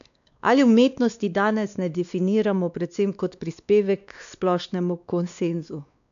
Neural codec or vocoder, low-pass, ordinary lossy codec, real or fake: codec, 16 kHz, 2 kbps, X-Codec, WavLM features, trained on Multilingual LibriSpeech; 7.2 kHz; MP3, 96 kbps; fake